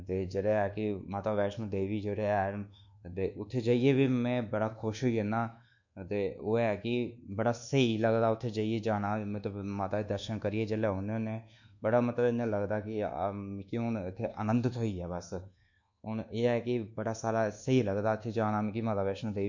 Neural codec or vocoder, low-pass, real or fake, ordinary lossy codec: codec, 24 kHz, 1.2 kbps, DualCodec; 7.2 kHz; fake; none